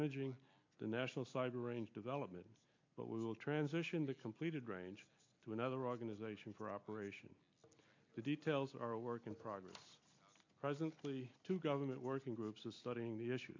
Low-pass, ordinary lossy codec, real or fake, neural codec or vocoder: 7.2 kHz; MP3, 48 kbps; real; none